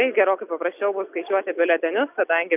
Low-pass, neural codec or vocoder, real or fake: 3.6 kHz; none; real